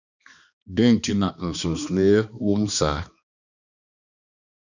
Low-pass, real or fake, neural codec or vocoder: 7.2 kHz; fake; codec, 16 kHz, 2 kbps, X-Codec, HuBERT features, trained on balanced general audio